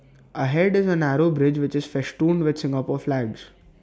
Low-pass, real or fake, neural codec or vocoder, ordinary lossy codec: none; real; none; none